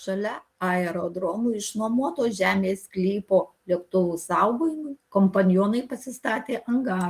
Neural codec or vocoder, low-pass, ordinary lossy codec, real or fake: none; 14.4 kHz; Opus, 24 kbps; real